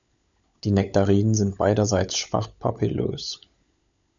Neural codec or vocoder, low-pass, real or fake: codec, 16 kHz, 16 kbps, FunCodec, trained on LibriTTS, 50 frames a second; 7.2 kHz; fake